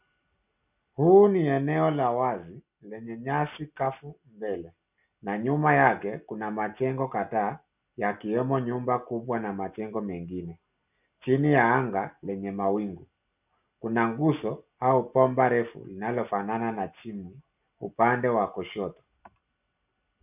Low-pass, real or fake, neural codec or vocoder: 3.6 kHz; real; none